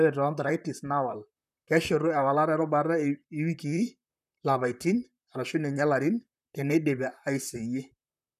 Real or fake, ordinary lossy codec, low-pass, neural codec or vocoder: fake; none; 14.4 kHz; vocoder, 44.1 kHz, 128 mel bands, Pupu-Vocoder